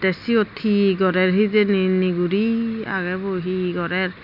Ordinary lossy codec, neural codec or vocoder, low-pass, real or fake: none; none; 5.4 kHz; real